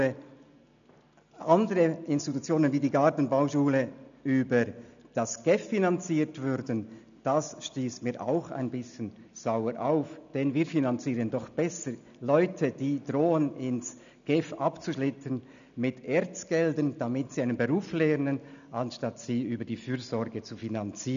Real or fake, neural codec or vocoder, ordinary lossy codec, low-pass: real; none; none; 7.2 kHz